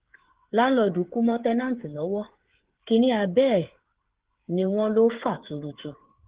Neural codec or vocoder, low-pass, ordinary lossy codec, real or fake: codec, 16 kHz, 8 kbps, FreqCodec, smaller model; 3.6 kHz; Opus, 16 kbps; fake